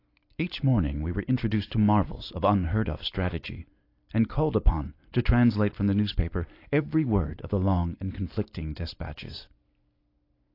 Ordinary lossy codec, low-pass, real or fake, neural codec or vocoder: AAC, 32 kbps; 5.4 kHz; real; none